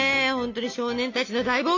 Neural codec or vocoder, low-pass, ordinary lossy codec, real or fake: none; 7.2 kHz; MP3, 48 kbps; real